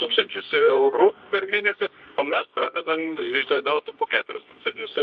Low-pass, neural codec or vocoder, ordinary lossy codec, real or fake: 9.9 kHz; codec, 24 kHz, 0.9 kbps, WavTokenizer, medium music audio release; MP3, 48 kbps; fake